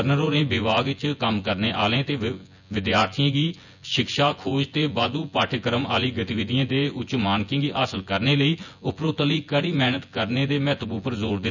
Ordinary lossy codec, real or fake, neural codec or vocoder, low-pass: none; fake; vocoder, 24 kHz, 100 mel bands, Vocos; 7.2 kHz